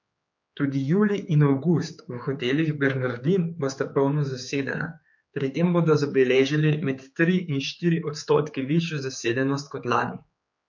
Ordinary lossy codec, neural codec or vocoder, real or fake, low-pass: MP3, 48 kbps; codec, 16 kHz, 4 kbps, X-Codec, HuBERT features, trained on general audio; fake; 7.2 kHz